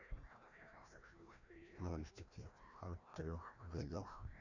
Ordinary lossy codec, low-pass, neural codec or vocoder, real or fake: none; 7.2 kHz; codec, 16 kHz, 1 kbps, FreqCodec, larger model; fake